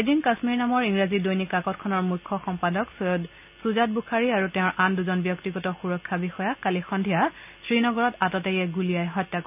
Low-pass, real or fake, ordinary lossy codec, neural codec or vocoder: 3.6 kHz; real; none; none